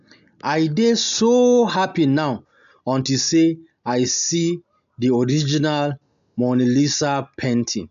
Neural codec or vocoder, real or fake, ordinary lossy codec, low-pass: none; real; AAC, 96 kbps; 7.2 kHz